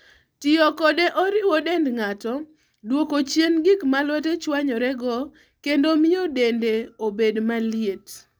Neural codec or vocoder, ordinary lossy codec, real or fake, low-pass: none; none; real; none